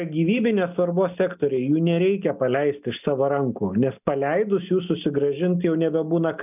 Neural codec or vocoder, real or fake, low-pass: none; real; 3.6 kHz